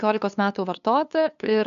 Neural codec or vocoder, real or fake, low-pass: codec, 16 kHz, 2 kbps, FunCodec, trained on LibriTTS, 25 frames a second; fake; 7.2 kHz